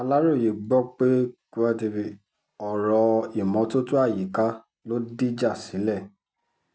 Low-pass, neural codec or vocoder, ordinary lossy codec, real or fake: none; none; none; real